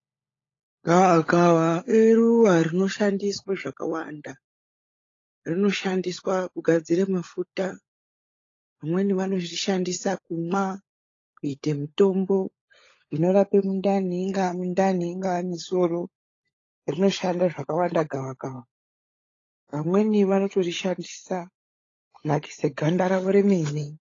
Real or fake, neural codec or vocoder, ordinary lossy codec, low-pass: fake; codec, 16 kHz, 16 kbps, FunCodec, trained on LibriTTS, 50 frames a second; AAC, 32 kbps; 7.2 kHz